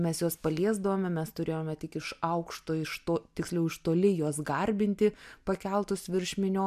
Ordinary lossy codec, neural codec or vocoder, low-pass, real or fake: MP3, 96 kbps; none; 14.4 kHz; real